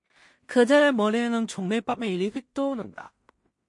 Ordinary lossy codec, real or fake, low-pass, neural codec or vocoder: MP3, 48 kbps; fake; 10.8 kHz; codec, 16 kHz in and 24 kHz out, 0.4 kbps, LongCat-Audio-Codec, two codebook decoder